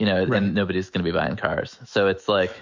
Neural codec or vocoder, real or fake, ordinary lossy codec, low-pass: autoencoder, 48 kHz, 128 numbers a frame, DAC-VAE, trained on Japanese speech; fake; MP3, 64 kbps; 7.2 kHz